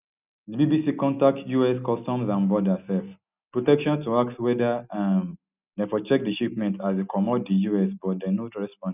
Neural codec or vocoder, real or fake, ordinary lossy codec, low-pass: none; real; none; 3.6 kHz